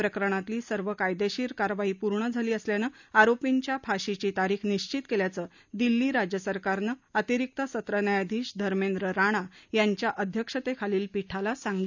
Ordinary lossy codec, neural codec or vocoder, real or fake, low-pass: none; none; real; 7.2 kHz